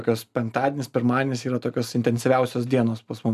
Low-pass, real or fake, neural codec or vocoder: 14.4 kHz; real; none